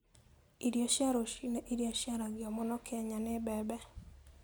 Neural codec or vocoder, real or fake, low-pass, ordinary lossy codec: none; real; none; none